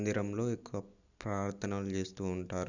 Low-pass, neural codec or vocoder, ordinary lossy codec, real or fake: 7.2 kHz; none; none; real